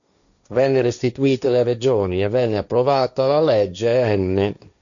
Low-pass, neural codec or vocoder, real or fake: 7.2 kHz; codec, 16 kHz, 1.1 kbps, Voila-Tokenizer; fake